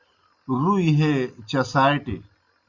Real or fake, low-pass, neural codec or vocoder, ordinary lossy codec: real; 7.2 kHz; none; Opus, 64 kbps